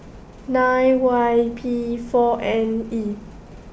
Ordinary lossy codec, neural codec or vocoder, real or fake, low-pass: none; none; real; none